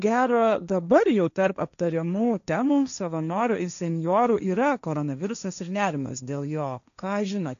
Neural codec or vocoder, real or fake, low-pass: codec, 16 kHz, 1.1 kbps, Voila-Tokenizer; fake; 7.2 kHz